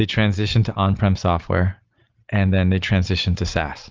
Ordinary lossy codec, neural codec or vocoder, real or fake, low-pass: Opus, 32 kbps; none; real; 7.2 kHz